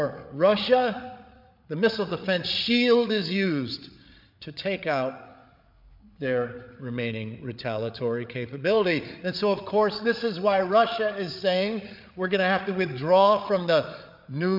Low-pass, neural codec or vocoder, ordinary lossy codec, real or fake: 5.4 kHz; codec, 16 kHz, 8 kbps, FreqCodec, larger model; MP3, 48 kbps; fake